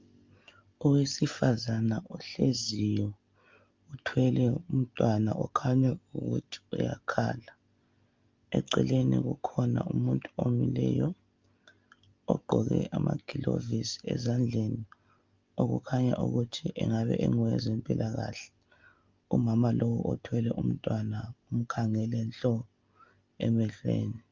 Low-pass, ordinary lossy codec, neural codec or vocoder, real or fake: 7.2 kHz; Opus, 24 kbps; none; real